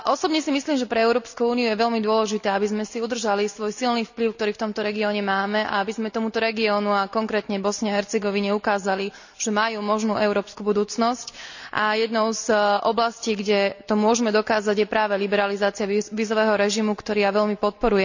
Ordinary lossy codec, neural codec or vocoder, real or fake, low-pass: none; none; real; 7.2 kHz